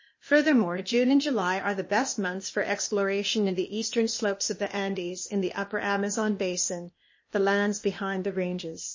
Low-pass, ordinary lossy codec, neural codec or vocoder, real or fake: 7.2 kHz; MP3, 32 kbps; codec, 16 kHz, 0.8 kbps, ZipCodec; fake